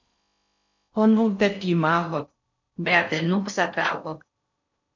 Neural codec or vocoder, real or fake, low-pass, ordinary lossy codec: codec, 16 kHz in and 24 kHz out, 0.6 kbps, FocalCodec, streaming, 4096 codes; fake; 7.2 kHz; MP3, 48 kbps